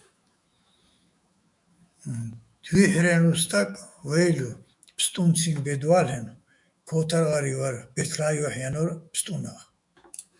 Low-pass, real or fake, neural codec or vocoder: 10.8 kHz; fake; autoencoder, 48 kHz, 128 numbers a frame, DAC-VAE, trained on Japanese speech